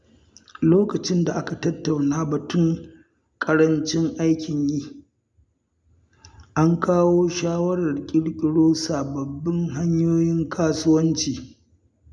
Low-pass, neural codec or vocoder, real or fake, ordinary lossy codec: none; none; real; none